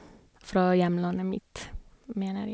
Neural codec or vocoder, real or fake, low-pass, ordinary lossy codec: none; real; none; none